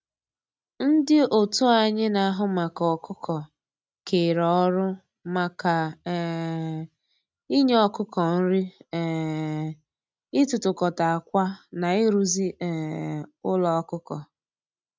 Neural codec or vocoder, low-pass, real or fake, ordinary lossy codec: none; none; real; none